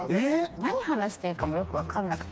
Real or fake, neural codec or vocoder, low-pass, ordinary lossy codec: fake; codec, 16 kHz, 2 kbps, FreqCodec, smaller model; none; none